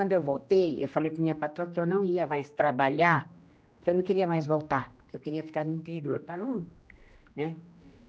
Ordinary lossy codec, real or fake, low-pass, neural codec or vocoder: none; fake; none; codec, 16 kHz, 1 kbps, X-Codec, HuBERT features, trained on general audio